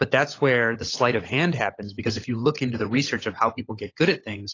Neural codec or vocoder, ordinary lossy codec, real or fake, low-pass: codec, 16 kHz, 16 kbps, FunCodec, trained on LibriTTS, 50 frames a second; AAC, 32 kbps; fake; 7.2 kHz